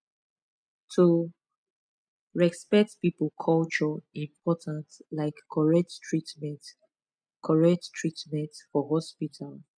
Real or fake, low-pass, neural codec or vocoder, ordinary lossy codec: real; 9.9 kHz; none; none